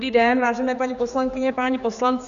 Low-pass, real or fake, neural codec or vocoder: 7.2 kHz; fake; codec, 16 kHz, 4 kbps, X-Codec, HuBERT features, trained on general audio